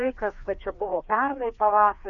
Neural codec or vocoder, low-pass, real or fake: codec, 16 kHz, 2 kbps, FreqCodec, larger model; 7.2 kHz; fake